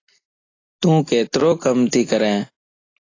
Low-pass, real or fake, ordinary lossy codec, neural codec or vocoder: 7.2 kHz; real; AAC, 32 kbps; none